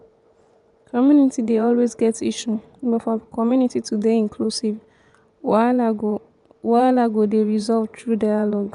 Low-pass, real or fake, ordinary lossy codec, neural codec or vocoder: 10.8 kHz; fake; none; vocoder, 24 kHz, 100 mel bands, Vocos